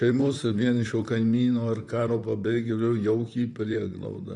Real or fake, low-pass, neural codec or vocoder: fake; 10.8 kHz; vocoder, 44.1 kHz, 128 mel bands, Pupu-Vocoder